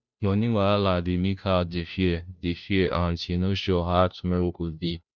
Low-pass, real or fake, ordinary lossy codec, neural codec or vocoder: none; fake; none; codec, 16 kHz, 0.5 kbps, FunCodec, trained on Chinese and English, 25 frames a second